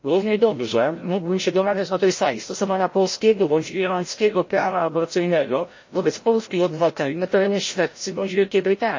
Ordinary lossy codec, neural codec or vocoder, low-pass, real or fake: MP3, 32 kbps; codec, 16 kHz, 0.5 kbps, FreqCodec, larger model; 7.2 kHz; fake